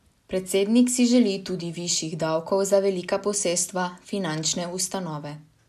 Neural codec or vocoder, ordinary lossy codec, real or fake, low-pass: none; none; real; 14.4 kHz